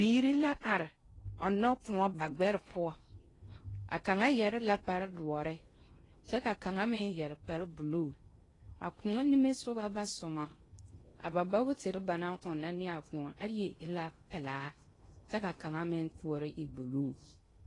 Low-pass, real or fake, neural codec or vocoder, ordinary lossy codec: 10.8 kHz; fake; codec, 16 kHz in and 24 kHz out, 0.6 kbps, FocalCodec, streaming, 4096 codes; AAC, 32 kbps